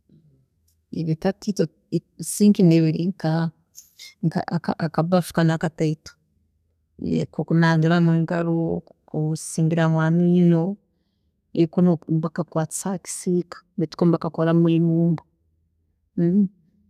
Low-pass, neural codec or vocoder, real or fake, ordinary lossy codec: 14.4 kHz; codec, 32 kHz, 1.9 kbps, SNAC; fake; none